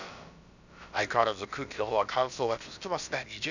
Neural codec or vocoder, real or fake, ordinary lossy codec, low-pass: codec, 16 kHz, about 1 kbps, DyCAST, with the encoder's durations; fake; none; 7.2 kHz